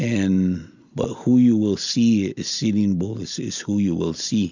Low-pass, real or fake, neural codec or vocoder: 7.2 kHz; real; none